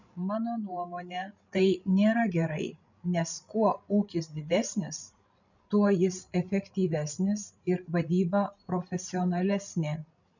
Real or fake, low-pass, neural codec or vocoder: fake; 7.2 kHz; codec, 16 kHz, 16 kbps, FreqCodec, larger model